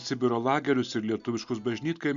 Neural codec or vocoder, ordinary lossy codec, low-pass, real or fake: none; Opus, 64 kbps; 7.2 kHz; real